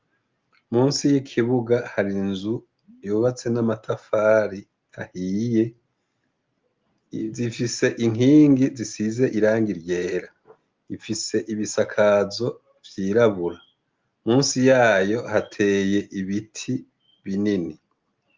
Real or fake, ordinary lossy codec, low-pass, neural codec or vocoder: real; Opus, 32 kbps; 7.2 kHz; none